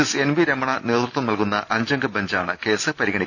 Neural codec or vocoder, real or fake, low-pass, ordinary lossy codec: none; real; none; none